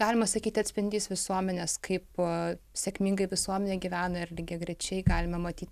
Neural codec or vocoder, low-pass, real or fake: none; 14.4 kHz; real